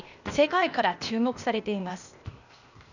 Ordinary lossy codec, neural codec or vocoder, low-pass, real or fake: none; codec, 16 kHz, 0.8 kbps, ZipCodec; 7.2 kHz; fake